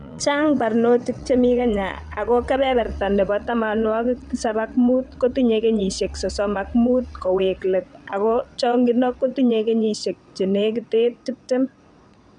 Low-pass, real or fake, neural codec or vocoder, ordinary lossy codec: 9.9 kHz; fake; vocoder, 22.05 kHz, 80 mel bands, WaveNeXt; none